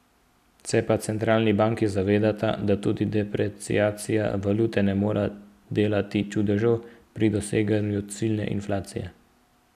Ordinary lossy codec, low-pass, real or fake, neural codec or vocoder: none; 14.4 kHz; real; none